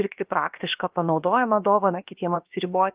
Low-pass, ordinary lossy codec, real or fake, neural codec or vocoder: 3.6 kHz; Opus, 24 kbps; fake; codec, 16 kHz, about 1 kbps, DyCAST, with the encoder's durations